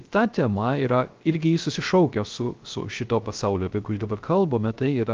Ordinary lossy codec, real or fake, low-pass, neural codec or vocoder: Opus, 24 kbps; fake; 7.2 kHz; codec, 16 kHz, 0.3 kbps, FocalCodec